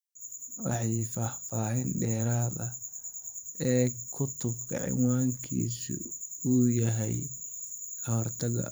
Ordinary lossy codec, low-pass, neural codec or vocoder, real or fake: none; none; vocoder, 44.1 kHz, 128 mel bands every 512 samples, BigVGAN v2; fake